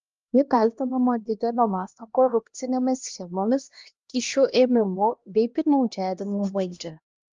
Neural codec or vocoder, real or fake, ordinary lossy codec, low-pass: codec, 16 kHz, 1 kbps, X-Codec, HuBERT features, trained on LibriSpeech; fake; Opus, 32 kbps; 7.2 kHz